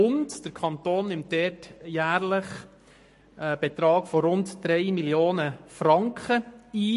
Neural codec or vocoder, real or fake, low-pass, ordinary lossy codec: codec, 44.1 kHz, 7.8 kbps, Pupu-Codec; fake; 14.4 kHz; MP3, 48 kbps